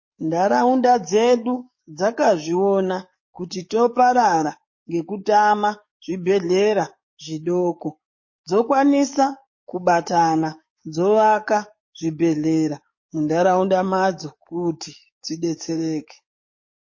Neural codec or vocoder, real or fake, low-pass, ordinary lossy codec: codec, 44.1 kHz, 7.8 kbps, DAC; fake; 7.2 kHz; MP3, 32 kbps